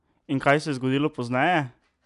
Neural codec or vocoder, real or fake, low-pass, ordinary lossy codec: none; real; 10.8 kHz; none